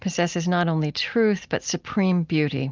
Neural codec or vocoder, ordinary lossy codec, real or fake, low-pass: none; Opus, 24 kbps; real; 7.2 kHz